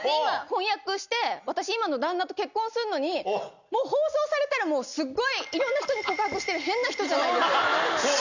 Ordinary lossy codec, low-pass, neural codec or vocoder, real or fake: none; 7.2 kHz; none; real